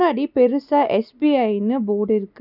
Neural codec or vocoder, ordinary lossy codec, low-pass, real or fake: none; none; 5.4 kHz; real